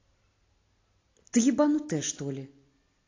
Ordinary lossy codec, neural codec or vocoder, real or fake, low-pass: AAC, 32 kbps; none; real; 7.2 kHz